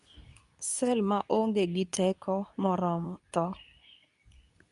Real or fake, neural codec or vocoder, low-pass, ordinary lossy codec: fake; codec, 24 kHz, 0.9 kbps, WavTokenizer, medium speech release version 2; 10.8 kHz; none